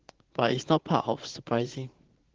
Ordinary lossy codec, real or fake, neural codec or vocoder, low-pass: Opus, 16 kbps; fake; vocoder, 44.1 kHz, 80 mel bands, Vocos; 7.2 kHz